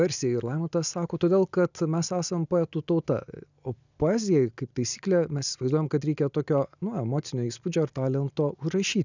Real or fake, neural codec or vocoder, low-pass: real; none; 7.2 kHz